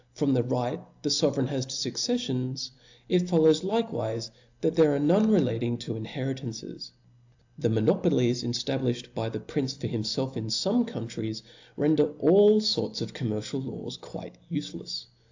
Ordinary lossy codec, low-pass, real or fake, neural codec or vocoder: AAC, 48 kbps; 7.2 kHz; real; none